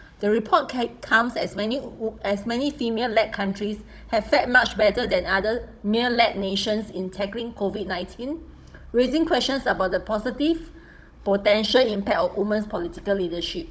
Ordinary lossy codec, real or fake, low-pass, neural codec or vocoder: none; fake; none; codec, 16 kHz, 16 kbps, FunCodec, trained on Chinese and English, 50 frames a second